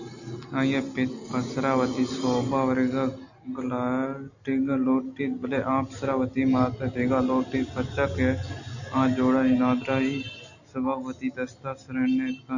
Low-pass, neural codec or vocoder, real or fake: 7.2 kHz; none; real